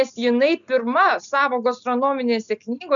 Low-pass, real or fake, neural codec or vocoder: 7.2 kHz; real; none